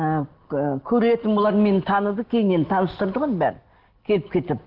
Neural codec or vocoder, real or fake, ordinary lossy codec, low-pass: none; real; Opus, 16 kbps; 5.4 kHz